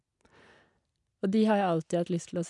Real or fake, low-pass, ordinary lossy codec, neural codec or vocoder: real; 10.8 kHz; none; none